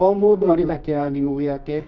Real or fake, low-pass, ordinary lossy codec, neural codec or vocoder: fake; 7.2 kHz; none; codec, 24 kHz, 0.9 kbps, WavTokenizer, medium music audio release